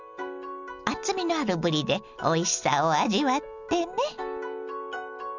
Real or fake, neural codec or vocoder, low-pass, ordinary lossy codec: real; none; 7.2 kHz; none